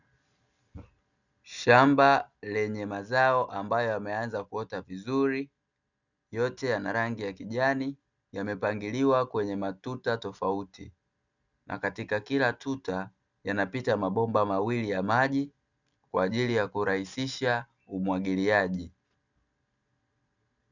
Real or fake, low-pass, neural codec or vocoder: real; 7.2 kHz; none